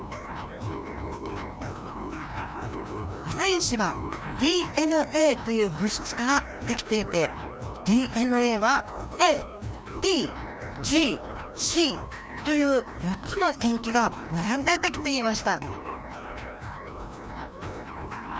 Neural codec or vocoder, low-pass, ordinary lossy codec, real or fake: codec, 16 kHz, 1 kbps, FreqCodec, larger model; none; none; fake